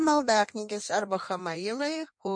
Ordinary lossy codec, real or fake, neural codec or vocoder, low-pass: MP3, 48 kbps; fake; codec, 16 kHz in and 24 kHz out, 1.1 kbps, FireRedTTS-2 codec; 9.9 kHz